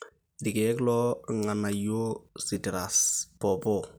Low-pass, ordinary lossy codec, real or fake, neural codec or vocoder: none; none; fake; vocoder, 44.1 kHz, 128 mel bands every 256 samples, BigVGAN v2